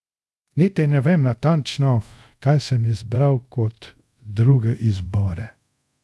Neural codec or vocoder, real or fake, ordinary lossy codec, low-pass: codec, 24 kHz, 0.5 kbps, DualCodec; fake; none; none